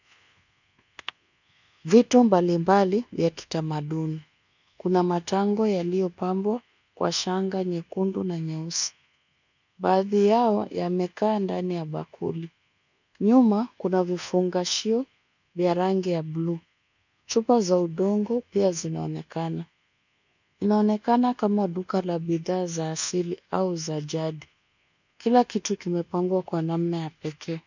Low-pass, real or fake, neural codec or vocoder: 7.2 kHz; fake; codec, 24 kHz, 1.2 kbps, DualCodec